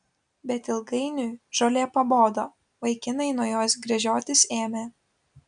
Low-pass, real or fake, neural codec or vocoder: 9.9 kHz; real; none